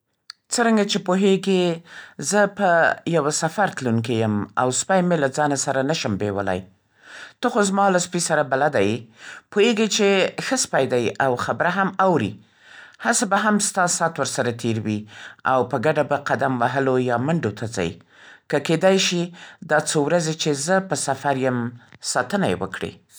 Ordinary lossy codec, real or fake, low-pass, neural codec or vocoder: none; real; none; none